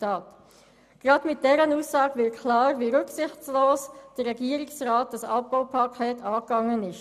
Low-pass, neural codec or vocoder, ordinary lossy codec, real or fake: 14.4 kHz; none; none; real